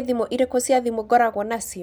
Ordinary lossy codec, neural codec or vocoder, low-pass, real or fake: none; none; none; real